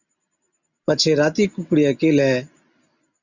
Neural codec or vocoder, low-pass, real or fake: none; 7.2 kHz; real